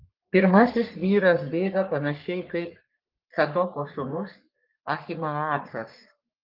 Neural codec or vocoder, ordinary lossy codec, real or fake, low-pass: codec, 44.1 kHz, 3.4 kbps, Pupu-Codec; Opus, 32 kbps; fake; 5.4 kHz